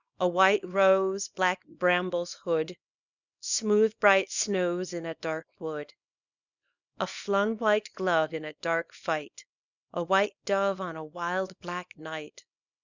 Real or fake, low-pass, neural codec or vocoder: fake; 7.2 kHz; codec, 24 kHz, 0.9 kbps, WavTokenizer, small release